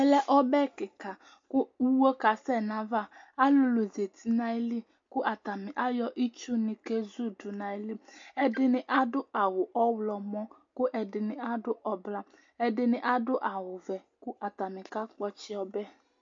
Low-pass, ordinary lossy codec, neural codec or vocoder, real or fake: 7.2 kHz; MP3, 48 kbps; none; real